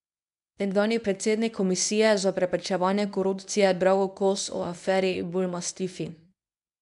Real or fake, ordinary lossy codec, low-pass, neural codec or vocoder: fake; none; 10.8 kHz; codec, 24 kHz, 0.9 kbps, WavTokenizer, medium speech release version 1